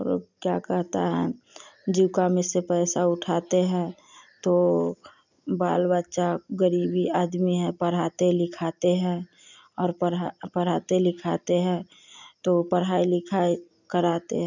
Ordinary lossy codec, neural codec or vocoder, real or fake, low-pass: none; none; real; 7.2 kHz